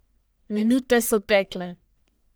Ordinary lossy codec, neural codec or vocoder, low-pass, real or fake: none; codec, 44.1 kHz, 1.7 kbps, Pupu-Codec; none; fake